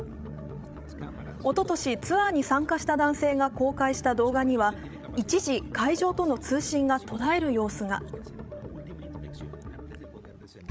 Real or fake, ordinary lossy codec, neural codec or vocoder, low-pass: fake; none; codec, 16 kHz, 16 kbps, FreqCodec, larger model; none